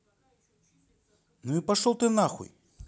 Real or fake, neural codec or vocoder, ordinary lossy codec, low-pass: real; none; none; none